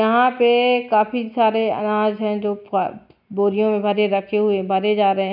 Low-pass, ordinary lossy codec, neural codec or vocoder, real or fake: 5.4 kHz; none; none; real